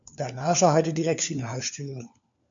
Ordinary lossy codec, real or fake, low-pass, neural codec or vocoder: MP3, 64 kbps; fake; 7.2 kHz; codec, 16 kHz, 4 kbps, FunCodec, trained on LibriTTS, 50 frames a second